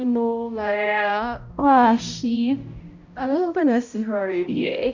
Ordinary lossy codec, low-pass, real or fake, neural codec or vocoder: Opus, 64 kbps; 7.2 kHz; fake; codec, 16 kHz, 0.5 kbps, X-Codec, HuBERT features, trained on balanced general audio